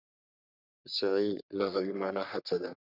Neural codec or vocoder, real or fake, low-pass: codec, 44.1 kHz, 3.4 kbps, Pupu-Codec; fake; 5.4 kHz